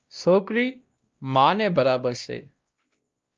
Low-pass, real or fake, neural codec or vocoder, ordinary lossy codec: 7.2 kHz; fake; codec, 16 kHz, 1 kbps, X-Codec, WavLM features, trained on Multilingual LibriSpeech; Opus, 32 kbps